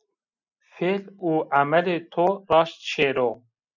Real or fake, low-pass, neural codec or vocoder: real; 7.2 kHz; none